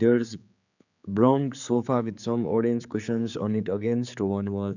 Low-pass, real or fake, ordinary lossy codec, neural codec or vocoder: 7.2 kHz; fake; none; codec, 16 kHz, 4 kbps, X-Codec, HuBERT features, trained on general audio